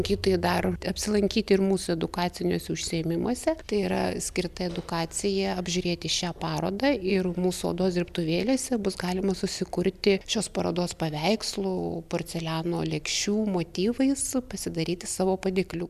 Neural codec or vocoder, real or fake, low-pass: none; real; 14.4 kHz